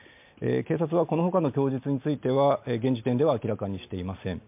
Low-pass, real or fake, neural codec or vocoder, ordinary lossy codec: 3.6 kHz; real; none; MP3, 32 kbps